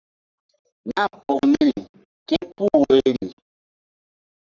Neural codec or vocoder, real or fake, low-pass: codec, 44.1 kHz, 3.4 kbps, Pupu-Codec; fake; 7.2 kHz